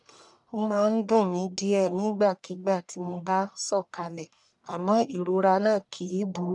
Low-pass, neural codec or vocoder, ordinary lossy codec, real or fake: 10.8 kHz; codec, 44.1 kHz, 1.7 kbps, Pupu-Codec; none; fake